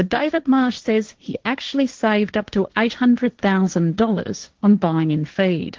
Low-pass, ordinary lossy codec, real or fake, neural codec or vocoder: 7.2 kHz; Opus, 16 kbps; fake; codec, 16 kHz, 1.1 kbps, Voila-Tokenizer